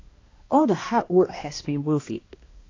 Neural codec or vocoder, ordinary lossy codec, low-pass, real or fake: codec, 16 kHz, 1 kbps, X-Codec, HuBERT features, trained on balanced general audio; AAC, 32 kbps; 7.2 kHz; fake